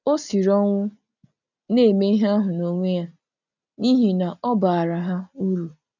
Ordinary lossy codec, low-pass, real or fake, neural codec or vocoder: none; 7.2 kHz; real; none